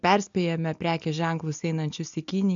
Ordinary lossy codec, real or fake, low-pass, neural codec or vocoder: AAC, 64 kbps; real; 7.2 kHz; none